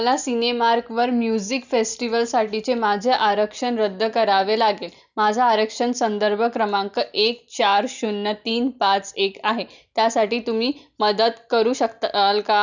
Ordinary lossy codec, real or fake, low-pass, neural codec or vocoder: none; real; 7.2 kHz; none